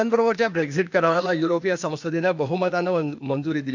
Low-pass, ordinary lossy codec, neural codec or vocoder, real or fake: 7.2 kHz; none; codec, 16 kHz, 0.8 kbps, ZipCodec; fake